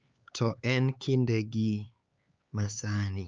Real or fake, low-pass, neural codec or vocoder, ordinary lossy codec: fake; 7.2 kHz; codec, 16 kHz, 4 kbps, X-Codec, HuBERT features, trained on LibriSpeech; Opus, 24 kbps